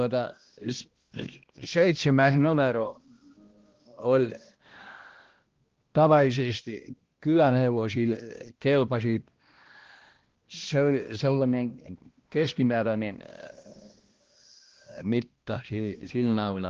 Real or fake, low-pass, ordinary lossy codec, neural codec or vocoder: fake; 7.2 kHz; Opus, 16 kbps; codec, 16 kHz, 1 kbps, X-Codec, HuBERT features, trained on balanced general audio